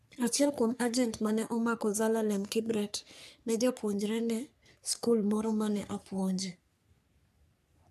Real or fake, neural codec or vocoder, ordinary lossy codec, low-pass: fake; codec, 44.1 kHz, 3.4 kbps, Pupu-Codec; none; 14.4 kHz